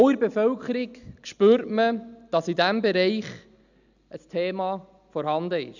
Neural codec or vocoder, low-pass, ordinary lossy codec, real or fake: none; 7.2 kHz; none; real